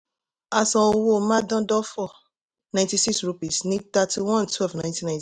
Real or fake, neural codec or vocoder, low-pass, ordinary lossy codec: real; none; none; none